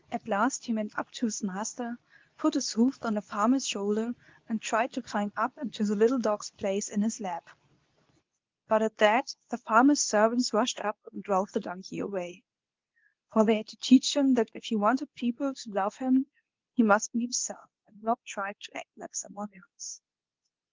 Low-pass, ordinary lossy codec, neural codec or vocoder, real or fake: 7.2 kHz; Opus, 32 kbps; codec, 24 kHz, 0.9 kbps, WavTokenizer, medium speech release version 2; fake